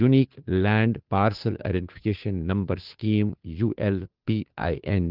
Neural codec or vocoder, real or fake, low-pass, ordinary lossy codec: codec, 16 kHz, 2 kbps, FunCodec, trained on Chinese and English, 25 frames a second; fake; 5.4 kHz; Opus, 32 kbps